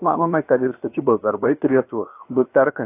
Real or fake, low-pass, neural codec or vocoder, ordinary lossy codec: fake; 3.6 kHz; codec, 16 kHz, about 1 kbps, DyCAST, with the encoder's durations; AAC, 24 kbps